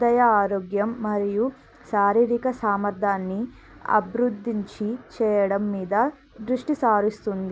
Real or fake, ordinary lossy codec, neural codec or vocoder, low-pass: real; none; none; none